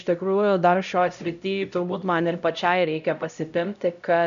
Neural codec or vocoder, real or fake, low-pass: codec, 16 kHz, 0.5 kbps, X-Codec, HuBERT features, trained on LibriSpeech; fake; 7.2 kHz